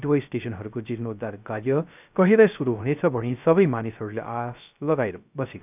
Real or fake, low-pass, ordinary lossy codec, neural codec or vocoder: fake; 3.6 kHz; none; codec, 16 kHz, 0.3 kbps, FocalCodec